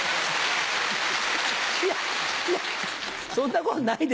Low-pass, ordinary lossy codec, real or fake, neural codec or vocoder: none; none; real; none